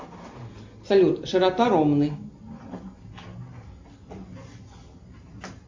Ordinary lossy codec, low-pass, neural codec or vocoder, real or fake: MP3, 48 kbps; 7.2 kHz; none; real